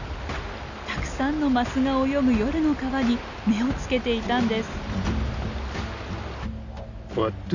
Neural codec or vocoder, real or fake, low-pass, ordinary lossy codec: none; real; 7.2 kHz; none